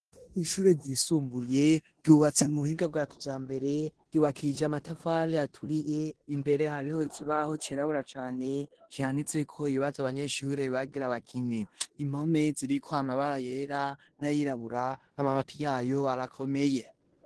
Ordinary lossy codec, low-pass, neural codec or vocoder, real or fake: Opus, 16 kbps; 10.8 kHz; codec, 16 kHz in and 24 kHz out, 0.9 kbps, LongCat-Audio-Codec, four codebook decoder; fake